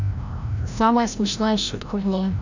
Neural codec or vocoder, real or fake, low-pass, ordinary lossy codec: codec, 16 kHz, 0.5 kbps, FreqCodec, larger model; fake; 7.2 kHz; none